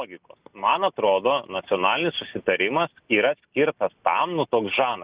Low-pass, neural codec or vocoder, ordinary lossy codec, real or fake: 3.6 kHz; none; Opus, 64 kbps; real